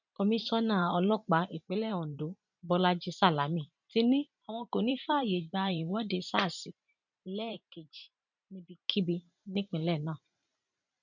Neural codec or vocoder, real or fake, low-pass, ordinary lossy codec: vocoder, 44.1 kHz, 128 mel bands every 512 samples, BigVGAN v2; fake; 7.2 kHz; none